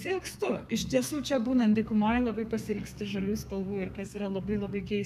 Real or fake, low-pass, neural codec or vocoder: fake; 14.4 kHz; codec, 32 kHz, 1.9 kbps, SNAC